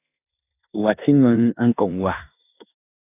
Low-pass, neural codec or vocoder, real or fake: 3.6 kHz; codec, 16 kHz in and 24 kHz out, 0.9 kbps, LongCat-Audio-Codec, four codebook decoder; fake